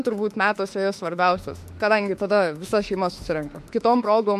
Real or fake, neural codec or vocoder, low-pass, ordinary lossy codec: fake; autoencoder, 48 kHz, 32 numbers a frame, DAC-VAE, trained on Japanese speech; 14.4 kHz; MP3, 64 kbps